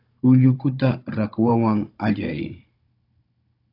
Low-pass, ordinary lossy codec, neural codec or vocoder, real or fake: 5.4 kHz; AAC, 32 kbps; codec, 16 kHz, 16 kbps, FunCodec, trained on Chinese and English, 50 frames a second; fake